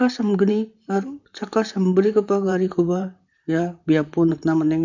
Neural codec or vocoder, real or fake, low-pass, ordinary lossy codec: vocoder, 44.1 kHz, 128 mel bands, Pupu-Vocoder; fake; 7.2 kHz; none